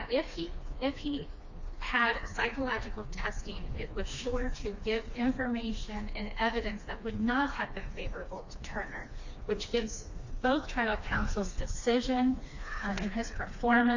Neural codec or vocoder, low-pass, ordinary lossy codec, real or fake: codec, 16 kHz, 2 kbps, FreqCodec, smaller model; 7.2 kHz; AAC, 48 kbps; fake